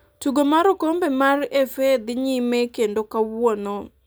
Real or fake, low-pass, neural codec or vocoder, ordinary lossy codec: real; none; none; none